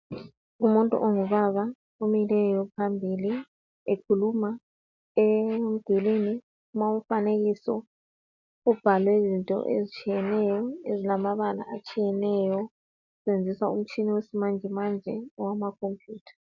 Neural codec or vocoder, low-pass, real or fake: none; 7.2 kHz; real